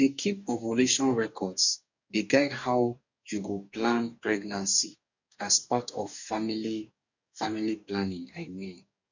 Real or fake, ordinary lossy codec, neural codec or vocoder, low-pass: fake; none; codec, 44.1 kHz, 2.6 kbps, DAC; 7.2 kHz